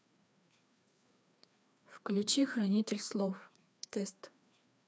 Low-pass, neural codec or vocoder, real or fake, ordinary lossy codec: none; codec, 16 kHz, 2 kbps, FreqCodec, larger model; fake; none